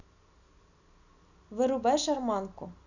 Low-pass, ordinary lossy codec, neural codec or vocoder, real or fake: 7.2 kHz; none; none; real